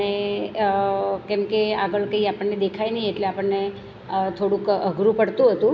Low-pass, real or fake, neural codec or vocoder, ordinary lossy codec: none; real; none; none